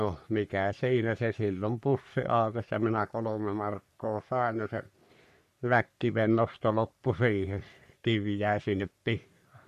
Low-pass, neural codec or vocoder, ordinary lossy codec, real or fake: 14.4 kHz; codec, 44.1 kHz, 3.4 kbps, Pupu-Codec; MP3, 64 kbps; fake